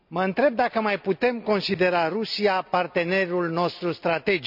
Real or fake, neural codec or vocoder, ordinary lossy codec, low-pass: real; none; none; 5.4 kHz